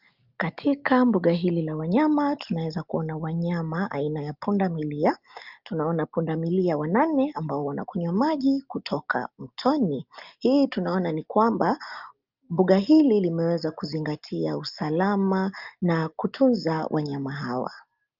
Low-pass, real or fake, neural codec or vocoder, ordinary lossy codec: 5.4 kHz; real; none; Opus, 24 kbps